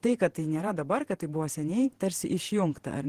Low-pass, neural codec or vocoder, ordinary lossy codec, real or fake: 14.4 kHz; vocoder, 48 kHz, 128 mel bands, Vocos; Opus, 16 kbps; fake